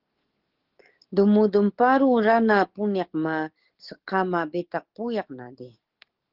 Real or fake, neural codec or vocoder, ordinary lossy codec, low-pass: fake; codec, 44.1 kHz, 7.8 kbps, DAC; Opus, 16 kbps; 5.4 kHz